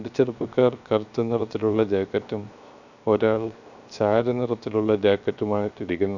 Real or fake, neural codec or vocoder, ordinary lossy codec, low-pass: fake; codec, 16 kHz, 0.7 kbps, FocalCodec; none; 7.2 kHz